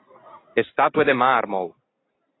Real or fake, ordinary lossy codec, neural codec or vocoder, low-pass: fake; AAC, 16 kbps; vocoder, 44.1 kHz, 128 mel bands every 256 samples, BigVGAN v2; 7.2 kHz